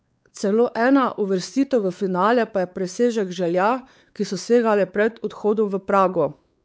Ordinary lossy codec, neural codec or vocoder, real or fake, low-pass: none; codec, 16 kHz, 4 kbps, X-Codec, WavLM features, trained on Multilingual LibriSpeech; fake; none